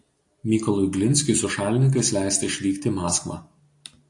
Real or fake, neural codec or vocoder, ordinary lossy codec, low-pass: real; none; AAC, 48 kbps; 10.8 kHz